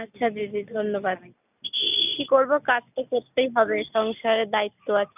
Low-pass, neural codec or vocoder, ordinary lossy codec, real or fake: 3.6 kHz; none; none; real